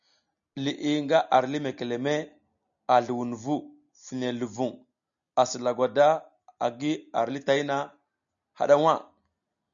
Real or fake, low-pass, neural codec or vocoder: real; 7.2 kHz; none